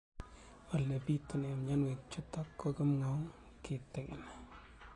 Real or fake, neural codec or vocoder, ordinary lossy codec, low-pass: real; none; AAC, 32 kbps; 10.8 kHz